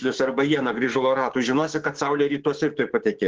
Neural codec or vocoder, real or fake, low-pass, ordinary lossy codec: codec, 16 kHz, 6 kbps, DAC; fake; 7.2 kHz; Opus, 16 kbps